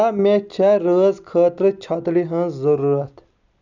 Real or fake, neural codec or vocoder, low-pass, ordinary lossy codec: real; none; 7.2 kHz; none